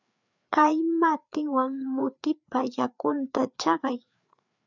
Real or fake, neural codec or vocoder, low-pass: fake; codec, 16 kHz, 4 kbps, FreqCodec, larger model; 7.2 kHz